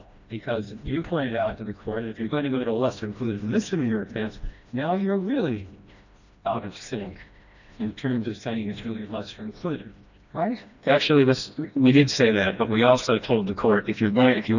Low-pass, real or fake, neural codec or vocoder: 7.2 kHz; fake; codec, 16 kHz, 1 kbps, FreqCodec, smaller model